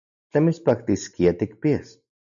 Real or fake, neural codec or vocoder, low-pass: real; none; 7.2 kHz